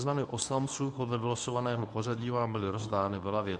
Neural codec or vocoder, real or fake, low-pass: codec, 24 kHz, 0.9 kbps, WavTokenizer, medium speech release version 1; fake; 10.8 kHz